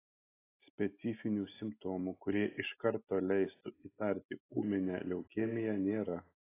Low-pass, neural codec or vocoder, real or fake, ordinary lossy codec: 3.6 kHz; none; real; AAC, 16 kbps